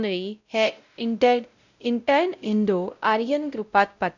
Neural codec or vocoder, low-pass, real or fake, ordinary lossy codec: codec, 16 kHz, 0.5 kbps, X-Codec, WavLM features, trained on Multilingual LibriSpeech; 7.2 kHz; fake; none